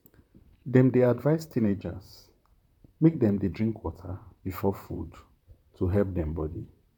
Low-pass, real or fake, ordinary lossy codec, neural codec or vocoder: 19.8 kHz; fake; none; vocoder, 44.1 kHz, 128 mel bands, Pupu-Vocoder